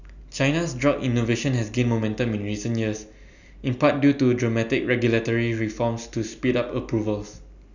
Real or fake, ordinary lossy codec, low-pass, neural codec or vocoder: real; none; 7.2 kHz; none